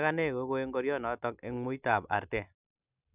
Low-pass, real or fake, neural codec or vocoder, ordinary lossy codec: 3.6 kHz; real; none; none